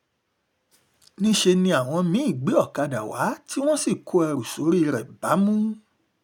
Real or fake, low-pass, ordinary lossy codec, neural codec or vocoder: real; 19.8 kHz; none; none